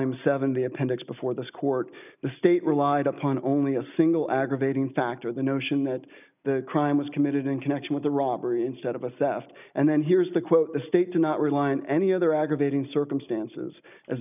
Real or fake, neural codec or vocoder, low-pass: real; none; 3.6 kHz